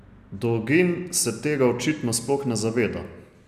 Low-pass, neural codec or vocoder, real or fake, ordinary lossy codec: 14.4 kHz; none; real; none